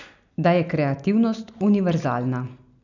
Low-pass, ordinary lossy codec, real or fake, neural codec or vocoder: 7.2 kHz; none; real; none